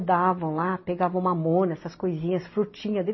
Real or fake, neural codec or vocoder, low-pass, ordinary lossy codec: real; none; 7.2 kHz; MP3, 24 kbps